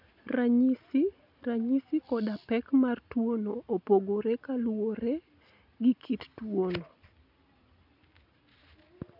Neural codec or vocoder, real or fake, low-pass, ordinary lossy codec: none; real; 5.4 kHz; none